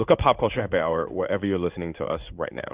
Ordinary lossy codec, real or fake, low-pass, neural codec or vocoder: Opus, 64 kbps; fake; 3.6 kHz; codec, 16 kHz in and 24 kHz out, 1 kbps, XY-Tokenizer